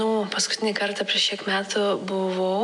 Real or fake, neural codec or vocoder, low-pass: real; none; 10.8 kHz